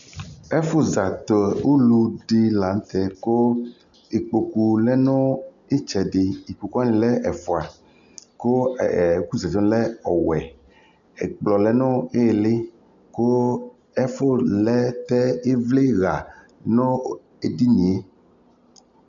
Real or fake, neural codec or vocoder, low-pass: real; none; 7.2 kHz